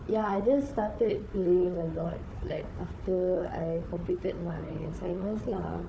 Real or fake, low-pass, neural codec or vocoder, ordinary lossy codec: fake; none; codec, 16 kHz, 4 kbps, FunCodec, trained on Chinese and English, 50 frames a second; none